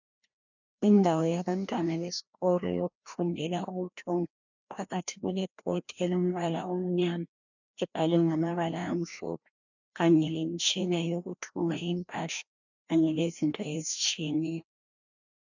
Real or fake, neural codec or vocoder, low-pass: fake; codec, 16 kHz, 1 kbps, FreqCodec, larger model; 7.2 kHz